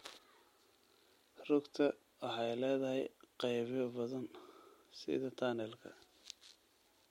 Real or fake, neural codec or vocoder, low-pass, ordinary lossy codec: real; none; 19.8 kHz; MP3, 64 kbps